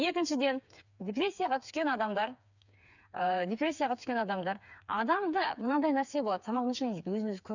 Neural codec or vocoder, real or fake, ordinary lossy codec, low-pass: codec, 16 kHz, 4 kbps, FreqCodec, smaller model; fake; none; 7.2 kHz